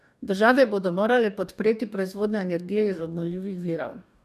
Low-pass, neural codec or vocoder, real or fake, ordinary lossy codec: 14.4 kHz; codec, 44.1 kHz, 2.6 kbps, DAC; fake; none